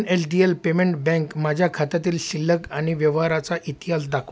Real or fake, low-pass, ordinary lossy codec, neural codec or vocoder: real; none; none; none